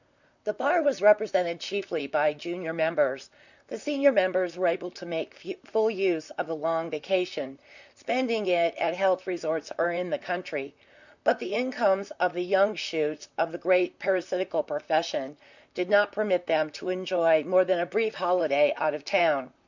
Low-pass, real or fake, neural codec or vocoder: 7.2 kHz; fake; vocoder, 44.1 kHz, 128 mel bands, Pupu-Vocoder